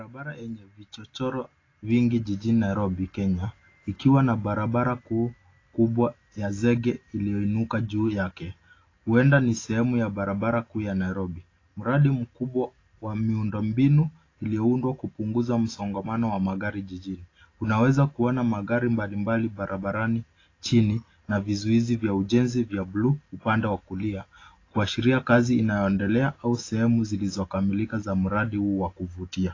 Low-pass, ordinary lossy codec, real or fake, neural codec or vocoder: 7.2 kHz; AAC, 32 kbps; real; none